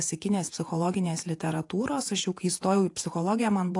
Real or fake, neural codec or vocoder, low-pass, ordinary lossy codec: fake; vocoder, 48 kHz, 128 mel bands, Vocos; 10.8 kHz; AAC, 48 kbps